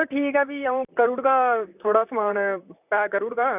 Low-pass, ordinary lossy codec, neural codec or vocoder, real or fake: 3.6 kHz; none; vocoder, 44.1 kHz, 128 mel bands, Pupu-Vocoder; fake